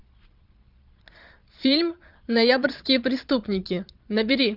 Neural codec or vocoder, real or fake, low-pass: vocoder, 22.05 kHz, 80 mel bands, Vocos; fake; 5.4 kHz